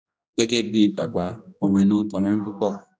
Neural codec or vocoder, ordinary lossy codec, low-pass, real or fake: codec, 16 kHz, 1 kbps, X-Codec, HuBERT features, trained on general audio; none; none; fake